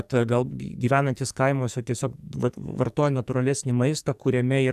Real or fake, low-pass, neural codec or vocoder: fake; 14.4 kHz; codec, 44.1 kHz, 2.6 kbps, SNAC